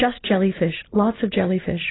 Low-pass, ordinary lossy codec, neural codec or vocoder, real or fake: 7.2 kHz; AAC, 16 kbps; none; real